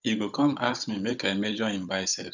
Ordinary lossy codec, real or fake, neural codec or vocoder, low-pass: none; fake; codec, 16 kHz, 16 kbps, FunCodec, trained on Chinese and English, 50 frames a second; 7.2 kHz